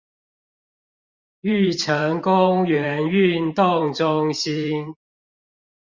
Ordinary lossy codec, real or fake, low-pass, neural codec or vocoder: Opus, 64 kbps; fake; 7.2 kHz; vocoder, 44.1 kHz, 128 mel bands every 512 samples, BigVGAN v2